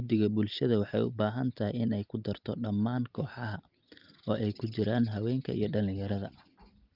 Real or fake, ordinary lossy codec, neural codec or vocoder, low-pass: real; Opus, 24 kbps; none; 5.4 kHz